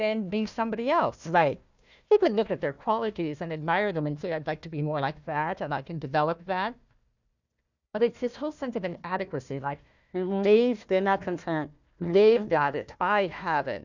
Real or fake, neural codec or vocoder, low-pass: fake; codec, 16 kHz, 1 kbps, FunCodec, trained on Chinese and English, 50 frames a second; 7.2 kHz